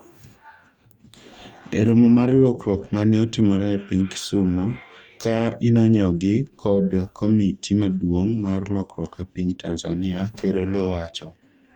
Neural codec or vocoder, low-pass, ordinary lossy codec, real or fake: codec, 44.1 kHz, 2.6 kbps, DAC; 19.8 kHz; Opus, 64 kbps; fake